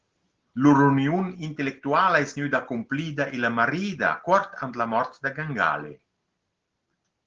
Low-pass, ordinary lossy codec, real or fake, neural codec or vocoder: 7.2 kHz; Opus, 16 kbps; real; none